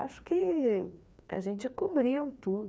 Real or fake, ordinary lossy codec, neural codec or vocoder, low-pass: fake; none; codec, 16 kHz, 2 kbps, FreqCodec, larger model; none